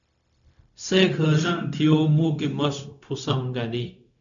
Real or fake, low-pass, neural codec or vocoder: fake; 7.2 kHz; codec, 16 kHz, 0.4 kbps, LongCat-Audio-Codec